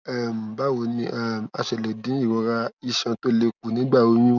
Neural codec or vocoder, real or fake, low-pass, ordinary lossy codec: none; real; 7.2 kHz; none